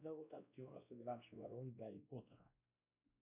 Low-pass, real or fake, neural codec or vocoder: 3.6 kHz; fake; codec, 16 kHz, 1 kbps, X-Codec, WavLM features, trained on Multilingual LibriSpeech